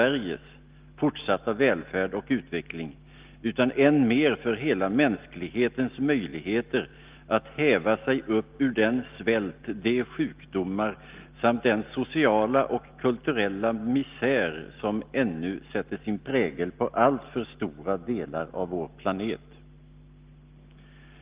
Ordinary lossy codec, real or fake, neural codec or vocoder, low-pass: Opus, 32 kbps; real; none; 3.6 kHz